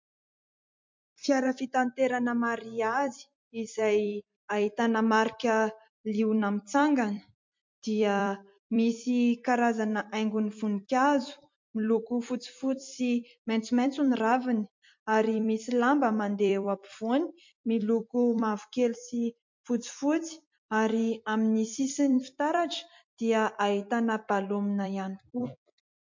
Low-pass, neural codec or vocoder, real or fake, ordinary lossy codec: 7.2 kHz; vocoder, 44.1 kHz, 128 mel bands every 256 samples, BigVGAN v2; fake; MP3, 48 kbps